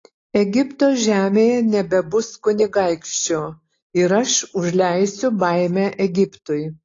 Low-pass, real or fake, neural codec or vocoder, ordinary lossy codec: 7.2 kHz; real; none; AAC, 32 kbps